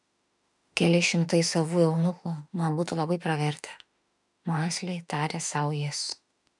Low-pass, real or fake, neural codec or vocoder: 10.8 kHz; fake; autoencoder, 48 kHz, 32 numbers a frame, DAC-VAE, trained on Japanese speech